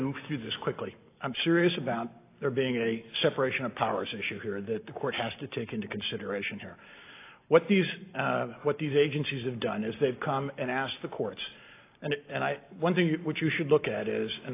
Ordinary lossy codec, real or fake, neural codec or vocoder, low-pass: AAC, 24 kbps; real; none; 3.6 kHz